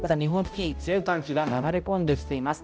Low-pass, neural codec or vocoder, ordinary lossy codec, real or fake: none; codec, 16 kHz, 0.5 kbps, X-Codec, HuBERT features, trained on balanced general audio; none; fake